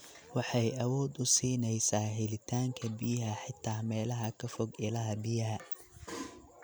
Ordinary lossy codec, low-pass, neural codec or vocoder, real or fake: none; none; none; real